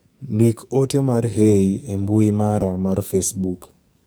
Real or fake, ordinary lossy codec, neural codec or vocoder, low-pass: fake; none; codec, 44.1 kHz, 2.6 kbps, SNAC; none